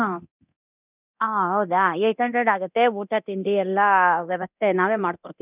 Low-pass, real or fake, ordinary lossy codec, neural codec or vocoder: 3.6 kHz; fake; none; codec, 24 kHz, 0.9 kbps, DualCodec